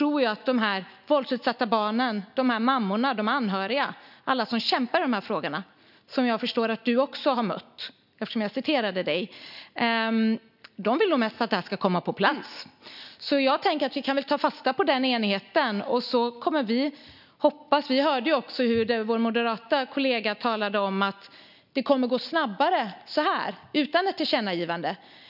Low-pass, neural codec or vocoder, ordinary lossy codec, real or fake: 5.4 kHz; none; none; real